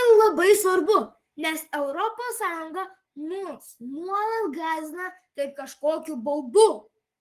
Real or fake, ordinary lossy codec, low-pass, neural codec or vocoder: fake; Opus, 24 kbps; 14.4 kHz; codec, 44.1 kHz, 7.8 kbps, Pupu-Codec